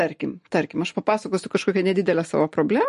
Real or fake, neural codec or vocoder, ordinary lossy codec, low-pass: real; none; MP3, 48 kbps; 10.8 kHz